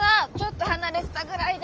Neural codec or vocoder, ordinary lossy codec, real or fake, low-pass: autoencoder, 48 kHz, 128 numbers a frame, DAC-VAE, trained on Japanese speech; Opus, 24 kbps; fake; 7.2 kHz